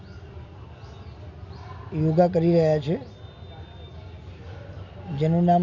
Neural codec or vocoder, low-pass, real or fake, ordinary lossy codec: none; 7.2 kHz; real; none